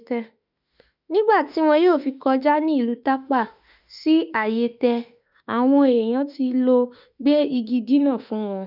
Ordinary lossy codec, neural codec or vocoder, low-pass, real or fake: none; autoencoder, 48 kHz, 32 numbers a frame, DAC-VAE, trained on Japanese speech; 5.4 kHz; fake